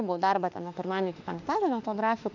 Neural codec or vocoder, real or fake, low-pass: autoencoder, 48 kHz, 32 numbers a frame, DAC-VAE, trained on Japanese speech; fake; 7.2 kHz